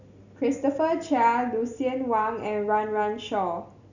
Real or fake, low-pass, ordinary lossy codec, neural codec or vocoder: real; 7.2 kHz; none; none